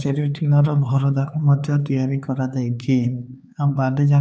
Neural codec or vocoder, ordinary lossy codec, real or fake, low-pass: codec, 16 kHz, 4 kbps, X-Codec, HuBERT features, trained on LibriSpeech; none; fake; none